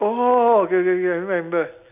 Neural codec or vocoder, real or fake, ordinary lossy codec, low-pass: none; real; none; 3.6 kHz